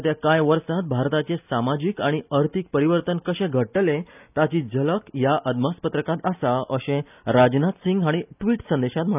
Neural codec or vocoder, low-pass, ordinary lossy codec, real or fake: none; 3.6 kHz; none; real